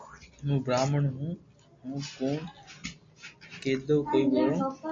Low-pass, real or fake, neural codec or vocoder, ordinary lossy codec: 7.2 kHz; real; none; AAC, 48 kbps